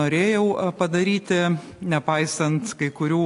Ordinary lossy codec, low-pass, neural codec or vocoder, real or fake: AAC, 48 kbps; 10.8 kHz; none; real